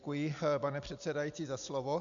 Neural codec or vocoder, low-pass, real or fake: none; 7.2 kHz; real